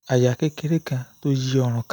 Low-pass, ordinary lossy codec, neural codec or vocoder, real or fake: none; none; none; real